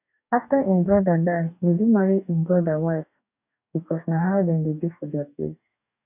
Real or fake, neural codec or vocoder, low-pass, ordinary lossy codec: fake; codec, 44.1 kHz, 2.6 kbps, DAC; 3.6 kHz; none